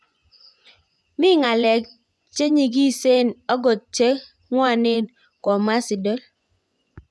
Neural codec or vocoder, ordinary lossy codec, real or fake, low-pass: vocoder, 24 kHz, 100 mel bands, Vocos; none; fake; none